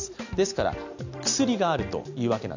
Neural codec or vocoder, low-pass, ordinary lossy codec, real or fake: none; 7.2 kHz; none; real